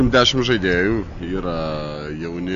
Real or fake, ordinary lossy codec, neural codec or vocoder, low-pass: real; MP3, 96 kbps; none; 7.2 kHz